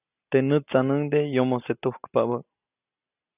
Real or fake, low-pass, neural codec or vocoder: real; 3.6 kHz; none